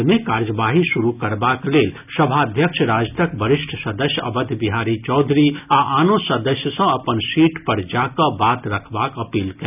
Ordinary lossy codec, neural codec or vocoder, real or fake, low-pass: none; none; real; 3.6 kHz